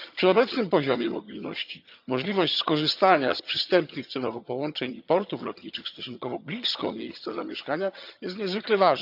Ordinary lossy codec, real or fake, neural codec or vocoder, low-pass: none; fake; vocoder, 22.05 kHz, 80 mel bands, HiFi-GAN; 5.4 kHz